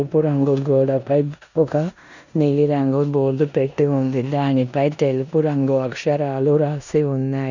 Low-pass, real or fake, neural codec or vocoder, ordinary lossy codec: 7.2 kHz; fake; codec, 16 kHz in and 24 kHz out, 0.9 kbps, LongCat-Audio-Codec, four codebook decoder; none